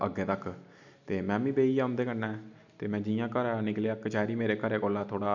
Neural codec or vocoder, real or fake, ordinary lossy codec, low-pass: none; real; none; 7.2 kHz